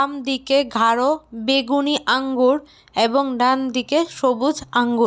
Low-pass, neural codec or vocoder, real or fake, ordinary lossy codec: none; none; real; none